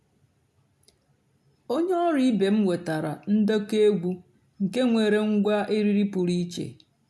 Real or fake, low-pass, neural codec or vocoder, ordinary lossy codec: real; none; none; none